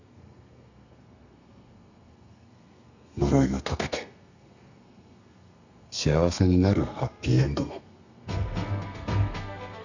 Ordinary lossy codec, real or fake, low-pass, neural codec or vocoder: none; fake; 7.2 kHz; codec, 32 kHz, 1.9 kbps, SNAC